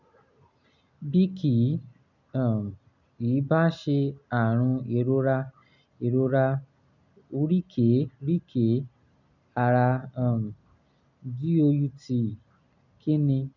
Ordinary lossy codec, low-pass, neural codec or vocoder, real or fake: none; 7.2 kHz; none; real